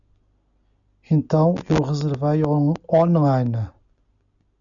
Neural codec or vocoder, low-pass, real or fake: none; 7.2 kHz; real